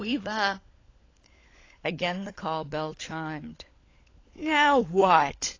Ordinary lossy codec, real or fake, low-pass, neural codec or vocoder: AAC, 32 kbps; fake; 7.2 kHz; codec, 16 kHz, 16 kbps, FunCodec, trained on LibriTTS, 50 frames a second